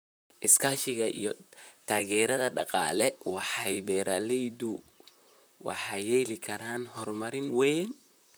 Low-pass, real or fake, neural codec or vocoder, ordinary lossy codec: none; fake; vocoder, 44.1 kHz, 128 mel bands, Pupu-Vocoder; none